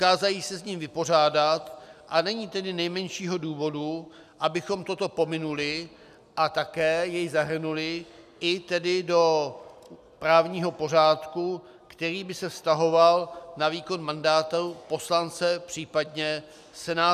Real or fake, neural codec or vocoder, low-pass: real; none; 14.4 kHz